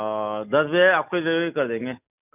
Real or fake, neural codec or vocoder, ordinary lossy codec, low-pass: real; none; none; 3.6 kHz